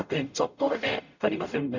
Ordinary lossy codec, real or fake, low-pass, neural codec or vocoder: none; fake; 7.2 kHz; codec, 44.1 kHz, 0.9 kbps, DAC